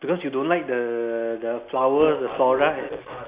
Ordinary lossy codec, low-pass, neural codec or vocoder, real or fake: Opus, 64 kbps; 3.6 kHz; none; real